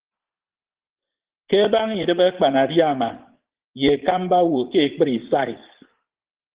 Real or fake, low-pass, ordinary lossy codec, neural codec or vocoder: fake; 3.6 kHz; Opus, 16 kbps; vocoder, 44.1 kHz, 80 mel bands, Vocos